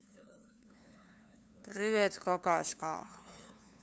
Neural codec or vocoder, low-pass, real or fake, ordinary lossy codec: codec, 16 kHz, 2 kbps, FunCodec, trained on LibriTTS, 25 frames a second; none; fake; none